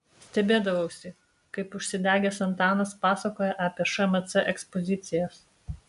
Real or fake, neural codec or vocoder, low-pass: real; none; 10.8 kHz